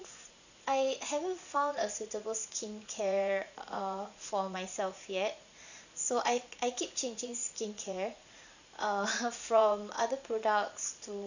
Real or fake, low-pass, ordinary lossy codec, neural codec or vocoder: fake; 7.2 kHz; none; vocoder, 44.1 kHz, 80 mel bands, Vocos